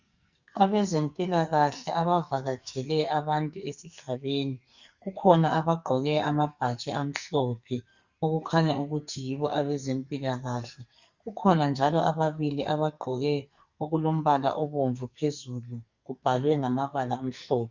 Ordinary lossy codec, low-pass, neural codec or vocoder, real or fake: Opus, 64 kbps; 7.2 kHz; codec, 44.1 kHz, 2.6 kbps, SNAC; fake